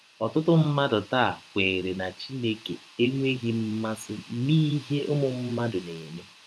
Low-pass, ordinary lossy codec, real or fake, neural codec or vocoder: none; none; fake; vocoder, 24 kHz, 100 mel bands, Vocos